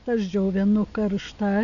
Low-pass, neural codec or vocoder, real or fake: 7.2 kHz; none; real